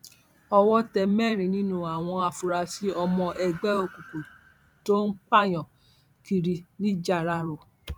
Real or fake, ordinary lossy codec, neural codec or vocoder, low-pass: fake; none; vocoder, 44.1 kHz, 128 mel bands every 512 samples, BigVGAN v2; 19.8 kHz